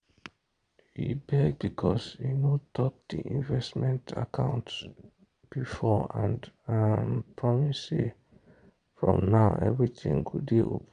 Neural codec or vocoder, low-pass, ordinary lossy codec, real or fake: vocoder, 22.05 kHz, 80 mel bands, Vocos; 9.9 kHz; none; fake